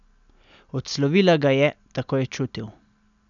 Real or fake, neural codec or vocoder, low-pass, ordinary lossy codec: real; none; 7.2 kHz; none